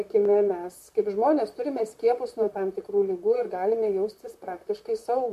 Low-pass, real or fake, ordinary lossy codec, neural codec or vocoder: 14.4 kHz; fake; MP3, 64 kbps; vocoder, 44.1 kHz, 128 mel bands, Pupu-Vocoder